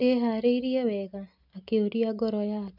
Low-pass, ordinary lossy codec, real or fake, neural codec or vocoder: 5.4 kHz; none; real; none